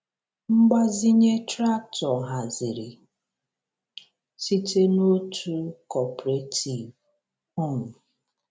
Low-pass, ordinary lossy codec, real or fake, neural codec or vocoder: none; none; real; none